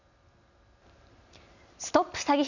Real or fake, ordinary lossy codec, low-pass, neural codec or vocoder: real; none; 7.2 kHz; none